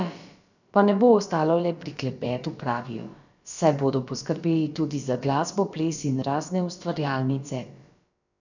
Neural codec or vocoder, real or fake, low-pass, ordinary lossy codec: codec, 16 kHz, about 1 kbps, DyCAST, with the encoder's durations; fake; 7.2 kHz; none